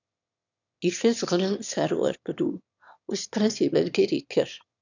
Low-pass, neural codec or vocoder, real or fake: 7.2 kHz; autoencoder, 22.05 kHz, a latent of 192 numbers a frame, VITS, trained on one speaker; fake